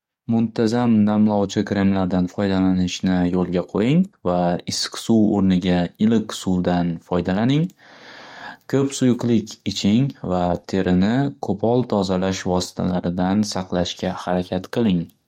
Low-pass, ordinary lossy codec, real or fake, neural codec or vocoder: 19.8 kHz; MP3, 64 kbps; fake; codec, 44.1 kHz, 7.8 kbps, DAC